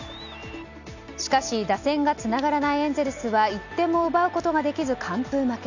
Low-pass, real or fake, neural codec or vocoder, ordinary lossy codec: 7.2 kHz; real; none; none